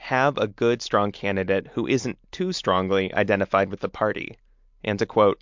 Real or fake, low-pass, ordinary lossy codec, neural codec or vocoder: real; 7.2 kHz; MP3, 64 kbps; none